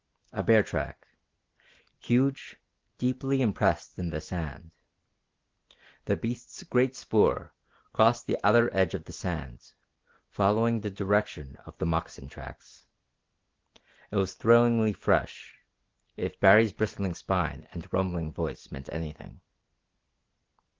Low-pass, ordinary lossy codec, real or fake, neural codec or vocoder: 7.2 kHz; Opus, 16 kbps; real; none